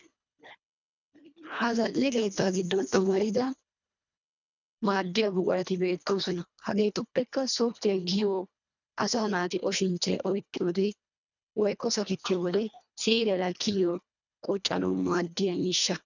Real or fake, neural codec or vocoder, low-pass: fake; codec, 24 kHz, 1.5 kbps, HILCodec; 7.2 kHz